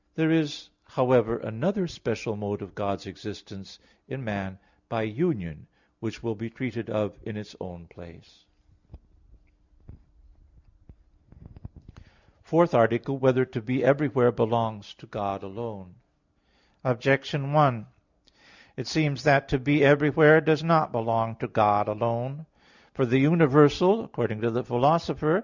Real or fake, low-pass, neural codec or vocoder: real; 7.2 kHz; none